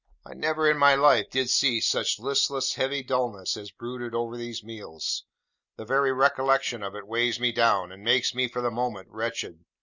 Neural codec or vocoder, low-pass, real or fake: none; 7.2 kHz; real